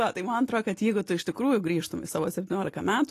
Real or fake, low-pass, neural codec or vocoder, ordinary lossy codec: real; 14.4 kHz; none; AAC, 48 kbps